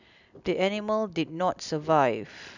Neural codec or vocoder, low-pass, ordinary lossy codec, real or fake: none; 7.2 kHz; none; real